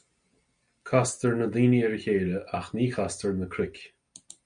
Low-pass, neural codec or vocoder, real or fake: 9.9 kHz; none; real